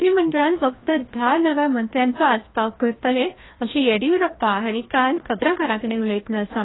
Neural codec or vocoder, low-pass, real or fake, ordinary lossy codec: codec, 16 kHz, 1 kbps, FreqCodec, larger model; 7.2 kHz; fake; AAC, 16 kbps